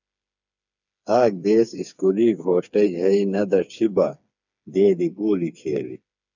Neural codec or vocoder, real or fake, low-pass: codec, 16 kHz, 4 kbps, FreqCodec, smaller model; fake; 7.2 kHz